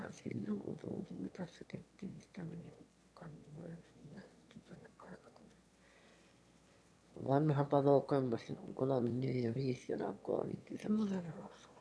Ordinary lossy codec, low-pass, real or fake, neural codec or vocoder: none; none; fake; autoencoder, 22.05 kHz, a latent of 192 numbers a frame, VITS, trained on one speaker